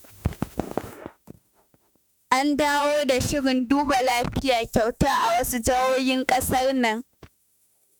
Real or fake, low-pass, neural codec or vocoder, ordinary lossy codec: fake; none; autoencoder, 48 kHz, 32 numbers a frame, DAC-VAE, trained on Japanese speech; none